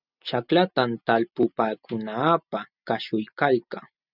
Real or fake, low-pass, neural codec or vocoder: real; 5.4 kHz; none